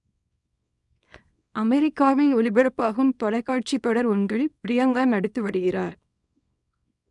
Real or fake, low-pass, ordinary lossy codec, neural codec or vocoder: fake; 10.8 kHz; none; codec, 24 kHz, 0.9 kbps, WavTokenizer, small release